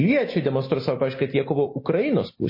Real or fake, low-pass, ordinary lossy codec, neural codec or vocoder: real; 5.4 kHz; MP3, 24 kbps; none